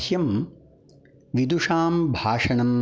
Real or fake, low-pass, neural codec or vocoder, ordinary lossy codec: real; none; none; none